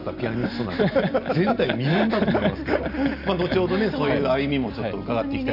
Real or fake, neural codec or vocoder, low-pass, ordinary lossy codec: real; none; 5.4 kHz; none